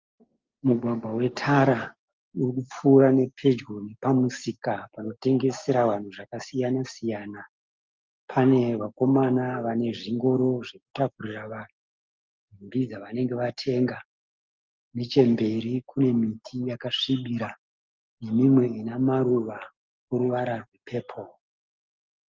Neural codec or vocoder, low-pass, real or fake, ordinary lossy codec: none; 7.2 kHz; real; Opus, 32 kbps